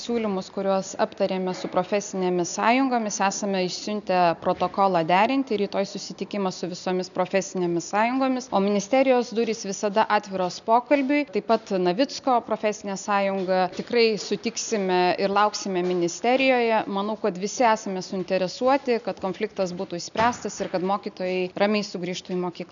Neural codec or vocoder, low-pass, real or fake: none; 7.2 kHz; real